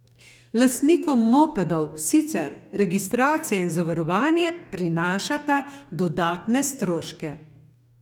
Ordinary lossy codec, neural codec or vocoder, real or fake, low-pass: none; codec, 44.1 kHz, 2.6 kbps, DAC; fake; 19.8 kHz